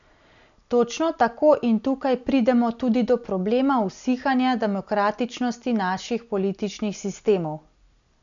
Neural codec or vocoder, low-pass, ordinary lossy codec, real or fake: none; 7.2 kHz; none; real